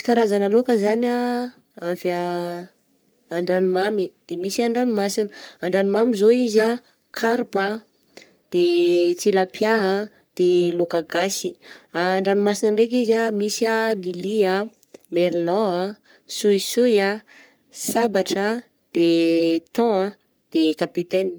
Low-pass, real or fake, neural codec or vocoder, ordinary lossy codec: none; fake; codec, 44.1 kHz, 3.4 kbps, Pupu-Codec; none